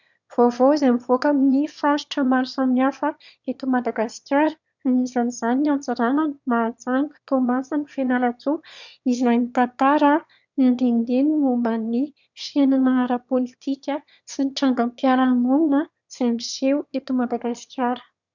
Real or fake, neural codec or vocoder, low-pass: fake; autoencoder, 22.05 kHz, a latent of 192 numbers a frame, VITS, trained on one speaker; 7.2 kHz